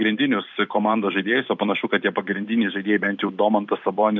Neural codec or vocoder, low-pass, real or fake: vocoder, 44.1 kHz, 128 mel bands every 256 samples, BigVGAN v2; 7.2 kHz; fake